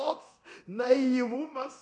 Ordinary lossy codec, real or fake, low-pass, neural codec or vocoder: MP3, 96 kbps; fake; 10.8 kHz; codec, 24 kHz, 0.9 kbps, DualCodec